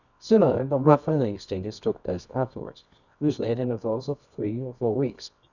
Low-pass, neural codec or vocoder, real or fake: 7.2 kHz; codec, 24 kHz, 0.9 kbps, WavTokenizer, medium music audio release; fake